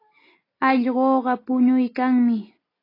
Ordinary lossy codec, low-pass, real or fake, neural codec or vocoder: AAC, 24 kbps; 5.4 kHz; real; none